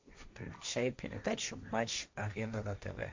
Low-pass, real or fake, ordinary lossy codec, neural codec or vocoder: 7.2 kHz; fake; MP3, 48 kbps; codec, 16 kHz, 1.1 kbps, Voila-Tokenizer